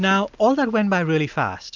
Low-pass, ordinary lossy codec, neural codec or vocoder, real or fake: 7.2 kHz; MP3, 64 kbps; none; real